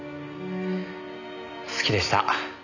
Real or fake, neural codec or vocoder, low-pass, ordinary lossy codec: real; none; 7.2 kHz; none